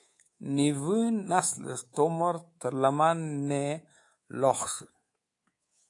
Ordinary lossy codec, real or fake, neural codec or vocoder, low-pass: AAC, 48 kbps; fake; codec, 24 kHz, 3.1 kbps, DualCodec; 10.8 kHz